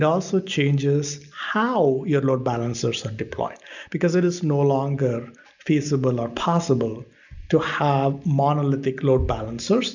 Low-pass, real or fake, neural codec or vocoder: 7.2 kHz; real; none